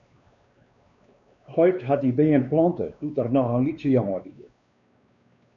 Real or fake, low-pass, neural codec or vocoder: fake; 7.2 kHz; codec, 16 kHz, 2 kbps, X-Codec, WavLM features, trained on Multilingual LibriSpeech